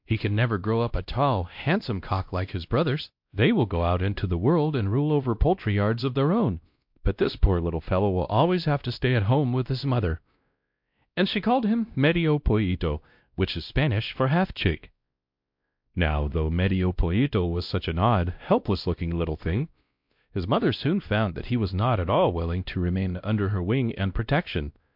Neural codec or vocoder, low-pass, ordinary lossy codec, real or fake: codec, 16 kHz, 1 kbps, X-Codec, WavLM features, trained on Multilingual LibriSpeech; 5.4 kHz; MP3, 48 kbps; fake